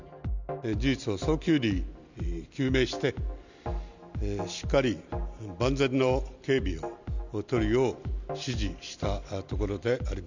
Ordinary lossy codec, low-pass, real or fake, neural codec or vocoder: none; 7.2 kHz; real; none